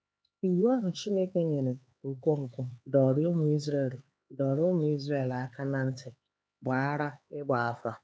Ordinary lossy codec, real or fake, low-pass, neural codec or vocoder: none; fake; none; codec, 16 kHz, 4 kbps, X-Codec, HuBERT features, trained on LibriSpeech